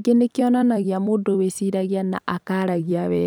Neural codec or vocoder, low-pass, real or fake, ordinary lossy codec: vocoder, 44.1 kHz, 128 mel bands every 256 samples, BigVGAN v2; 19.8 kHz; fake; none